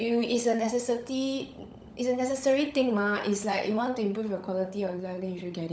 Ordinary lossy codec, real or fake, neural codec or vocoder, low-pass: none; fake; codec, 16 kHz, 16 kbps, FunCodec, trained on LibriTTS, 50 frames a second; none